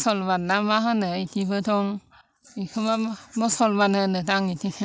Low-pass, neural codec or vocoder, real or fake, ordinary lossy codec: none; none; real; none